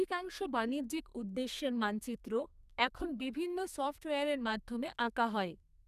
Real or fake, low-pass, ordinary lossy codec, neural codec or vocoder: fake; 14.4 kHz; none; codec, 32 kHz, 1.9 kbps, SNAC